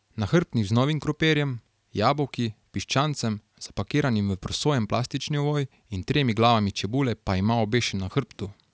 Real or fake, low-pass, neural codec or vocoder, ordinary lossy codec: real; none; none; none